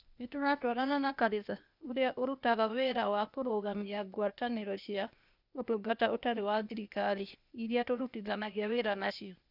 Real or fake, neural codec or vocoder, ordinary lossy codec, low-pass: fake; codec, 16 kHz, 0.8 kbps, ZipCodec; none; 5.4 kHz